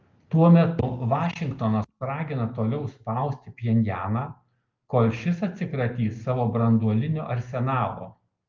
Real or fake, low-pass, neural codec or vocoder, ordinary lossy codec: real; 7.2 kHz; none; Opus, 32 kbps